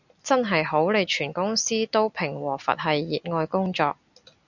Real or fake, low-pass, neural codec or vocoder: real; 7.2 kHz; none